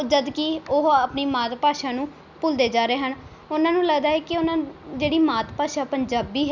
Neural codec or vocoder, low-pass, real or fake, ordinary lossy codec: none; 7.2 kHz; real; none